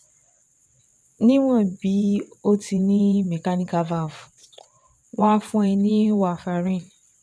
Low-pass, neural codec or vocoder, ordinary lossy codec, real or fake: none; vocoder, 22.05 kHz, 80 mel bands, WaveNeXt; none; fake